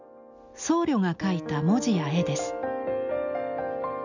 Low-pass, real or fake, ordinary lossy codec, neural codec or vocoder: 7.2 kHz; real; none; none